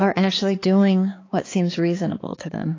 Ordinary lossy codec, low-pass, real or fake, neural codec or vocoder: AAC, 32 kbps; 7.2 kHz; fake; codec, 16 kHz, 4 kbps, X-Codec, HuBERT features, trained on balanced general audio